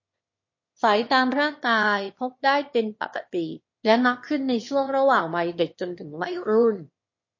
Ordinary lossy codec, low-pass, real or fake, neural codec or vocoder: MP3, 32 kbps; 7.2 kHz; fake; autoencoder, 22.05 kHz, a latent of 192 numbers a frame, VITS, trained on one speaker